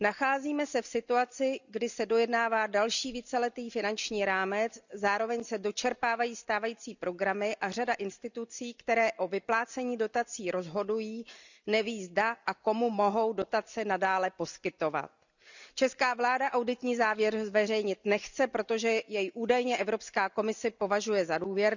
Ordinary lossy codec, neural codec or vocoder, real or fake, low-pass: none; none; real; 7.2 kHz